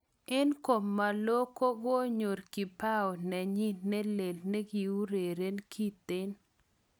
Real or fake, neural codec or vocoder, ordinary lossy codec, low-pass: real; none; none; none